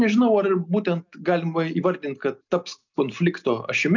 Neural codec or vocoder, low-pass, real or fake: none; 7.2 kHz; real